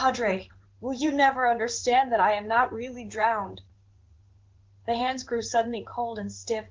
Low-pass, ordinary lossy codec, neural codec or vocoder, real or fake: 7.2 kHz; Opus, 16 kbps; codec, 16 kHz in and 24 kHz out, 1 kbps, XY-Tokenizer; fake